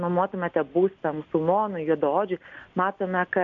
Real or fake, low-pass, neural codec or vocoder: real; 7.2 kHz; none